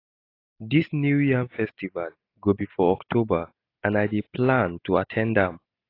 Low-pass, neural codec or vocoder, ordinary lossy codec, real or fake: 5.4 kHz; none; AAC, 32 kbps; real